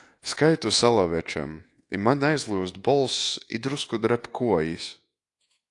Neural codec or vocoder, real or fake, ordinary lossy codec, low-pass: codec, 24 kHz, 1.2 kbps, DualCodec; fake; AAC, 48 kbps; 10.8 kHz